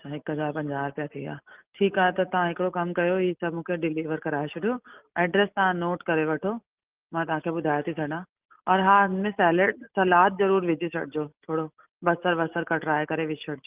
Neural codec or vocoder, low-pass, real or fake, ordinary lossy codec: none; 3.6 kHz; real; Opus, 24 kbps